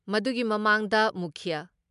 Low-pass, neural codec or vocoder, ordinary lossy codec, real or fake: 10.8 kHz; none; MP3, 96 kbps; real